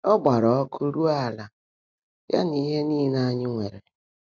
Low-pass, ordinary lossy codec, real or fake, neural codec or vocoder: none; none; real; none